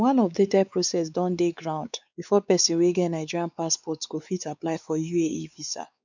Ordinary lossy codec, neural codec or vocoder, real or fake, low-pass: none; codec, 16 kHz, 4 kbps, X-Codec, WavLM features, trained on Multilingual LibriSpeech; fake; 7.2 kHz